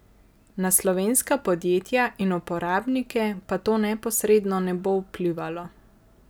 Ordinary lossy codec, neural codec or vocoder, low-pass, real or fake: none; none; none; real